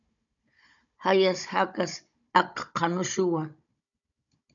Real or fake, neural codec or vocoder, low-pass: fake; codec, 16 kHz, 16 kbps, FunCodec, trained on Chinese and English, 50 frames a second; 7.2 kHz